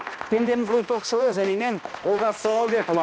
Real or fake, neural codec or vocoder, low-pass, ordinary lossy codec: fake; codec, 16 kHz, 1 kbps, X-Codec, HuBERT features, trained on balanced general audio; none; none